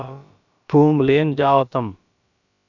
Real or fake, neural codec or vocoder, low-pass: fake; codec, 16 kHz, about 1 kbps, DyCAST, with the encoder's durations; 7.2 kHz